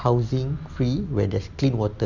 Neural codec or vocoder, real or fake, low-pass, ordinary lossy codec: none; real; 7.2 kHz; none